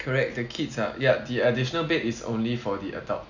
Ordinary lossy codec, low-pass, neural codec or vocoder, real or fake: none; 7.2 kHz; none; real